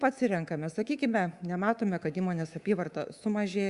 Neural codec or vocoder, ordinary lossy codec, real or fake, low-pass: codec, 24 kHz, 3.1 kbps, DualCodec; Opus, 64 kbps; fake; 10.8 kHz